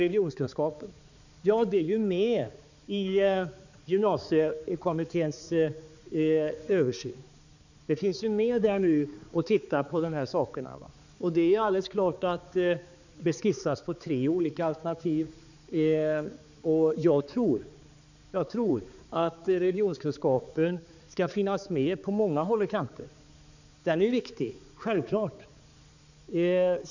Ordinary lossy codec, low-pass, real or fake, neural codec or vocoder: none; 7.2 kHz; fake; codec, 16 kHz, 4 kbps, X-Codec, HuBERT features, trained on balanced general audio